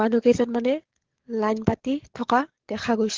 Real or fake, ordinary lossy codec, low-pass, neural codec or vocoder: fake; Opus, 16 kbps; 7.2 kHz; codec, 44.1 kHz, 7.8 kbps, DAC